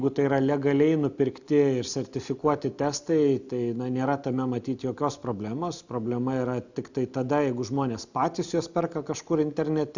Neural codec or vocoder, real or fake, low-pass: none; real; 7.2 kHz